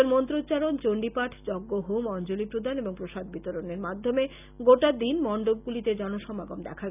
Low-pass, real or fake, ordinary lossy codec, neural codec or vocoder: 3.6 kHz; real; none; none